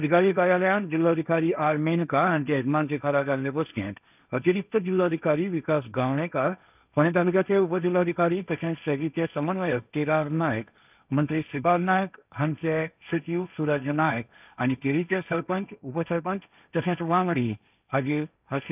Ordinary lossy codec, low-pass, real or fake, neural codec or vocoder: none; 3.6 kHz; fake; codec, 16 kHz, 1.1 kbps, Voila-Tokenizer